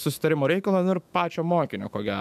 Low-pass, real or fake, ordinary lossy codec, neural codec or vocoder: 14.4 kHz; fake; MP3, 96 kbps; autoencoder, 48 kHz, 128 numbers a frame, DAC-VAE, trained on Japanese speech